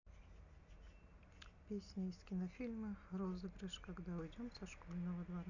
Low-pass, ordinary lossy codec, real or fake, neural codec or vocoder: 7.2 kHz; none; real; none